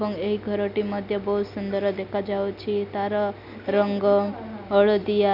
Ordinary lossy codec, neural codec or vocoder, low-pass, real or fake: none; none; 5.4 kHz; real